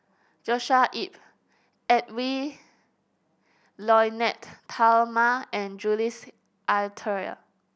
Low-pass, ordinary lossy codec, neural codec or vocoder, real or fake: none; none; none; real